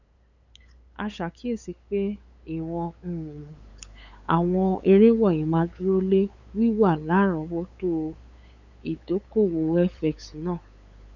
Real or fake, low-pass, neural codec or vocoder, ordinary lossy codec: fake; 7.2 kHz; codec, 16 kHz, 8 kbps, FunCodec, trained on LibriTTS, 25 frames a second; none